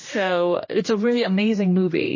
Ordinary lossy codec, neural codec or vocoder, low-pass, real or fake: MP3, 32 kbps; codec, 16 kHz, 1 kbps, X-Codec, HuBERT features, trained on general audio; 7.2 kHz; fake